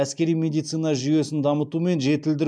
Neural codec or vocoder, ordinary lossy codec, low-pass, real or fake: none; MP3, 96 kbps; 9.9 kHz; real